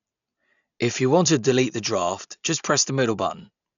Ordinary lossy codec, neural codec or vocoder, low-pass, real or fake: none; none; 7.2 kHz; real